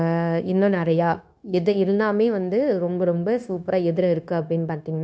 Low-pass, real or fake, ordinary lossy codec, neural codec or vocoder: none; fake; none; codec, 16 kHz, 0.9 kbps, LongCat-Audio-Codec